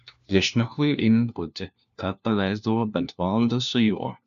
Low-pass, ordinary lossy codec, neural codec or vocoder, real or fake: 7.2 kHz; AAC, 96 kbps; codec, 16 kHz, 1 kbps, FunCodec, trained on LibriTTS, 50 frames a second; fake